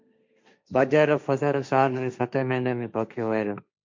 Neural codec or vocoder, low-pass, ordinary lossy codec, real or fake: codec, 16 kHz, 1.1 kbps, Voila-Tokenizer; 7.2 kHz; MP3, 64 kbps; fake